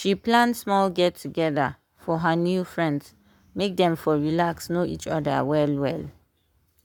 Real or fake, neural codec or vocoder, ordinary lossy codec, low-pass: fake; codec, 44.1 kHz, 7.8 kbps, Pupu-Codec; none; 19.8 kHz